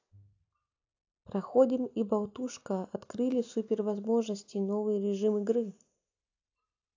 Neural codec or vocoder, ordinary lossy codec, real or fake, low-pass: none; AAC, 48 kbps; real; 7.2 kHz